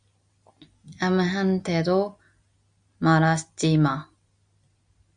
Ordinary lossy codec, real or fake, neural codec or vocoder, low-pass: Opus, 64 kbps; real; none; 9.9 kHz